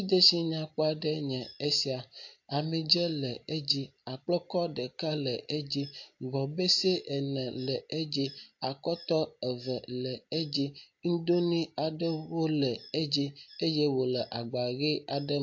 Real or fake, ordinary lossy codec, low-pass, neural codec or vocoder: real; AAC, 48 kbps; 7.2 kHz; none